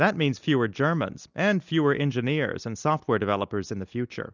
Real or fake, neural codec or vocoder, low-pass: real; none; 7.2 kHz